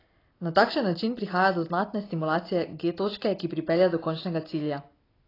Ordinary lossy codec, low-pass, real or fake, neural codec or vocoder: AAC, 24 kbps; 5.4 kHz; real; none